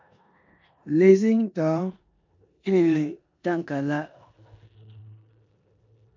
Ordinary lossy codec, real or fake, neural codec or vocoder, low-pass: MP3, 64 kbps; fake; codec, 16 kHz in and 24 kHz out, 0.9 kbps, LongCat-Audio-Codec, four codebook decoder; 7.2 kHz